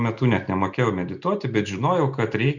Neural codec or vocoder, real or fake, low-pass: none; real; 7.2 kHz